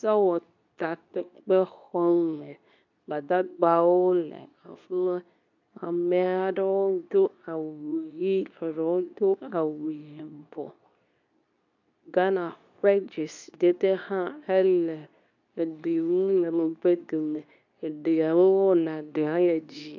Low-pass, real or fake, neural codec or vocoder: 7.2 kHz; fake; codec, 24 kHz, 0.9 kbps, WavTokenizer, medium speech release version 2